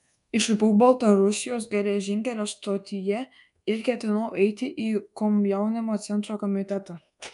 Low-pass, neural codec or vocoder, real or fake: 10.8 kHz; codec, 24 kHz, 1.2 kbps, DualCodec; fake